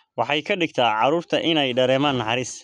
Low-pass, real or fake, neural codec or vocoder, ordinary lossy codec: 10.8 kHz; real; none; none